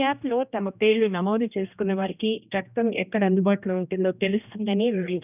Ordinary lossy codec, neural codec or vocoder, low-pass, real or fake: none; codec, 16 kHz, 1 kbps, X-Codec, HuBERT features, trained on general audio; 3.6 kHz; fake